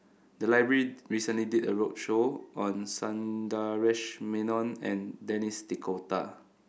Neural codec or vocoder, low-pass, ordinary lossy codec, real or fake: none; none; none; real